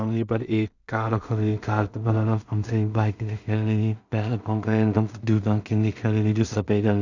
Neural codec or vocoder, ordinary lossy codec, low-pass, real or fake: codec, 16 kHz in and 24 kHz out, 0.4 kbps, LongCat-Audio-Codec, two codebook decoder; none; 7.2 kHz; fake